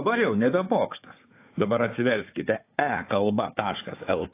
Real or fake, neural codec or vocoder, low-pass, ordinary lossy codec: fake; codec, 16 kHz, 4 kbps, FunCodec, trained on Chinese and English, 50 frames a second; 3.6 kHz; AAC, 24 kbps